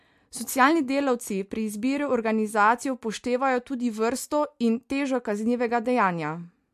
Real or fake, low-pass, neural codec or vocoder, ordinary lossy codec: real; 14.4 kHz; none; MP3, 64 kbps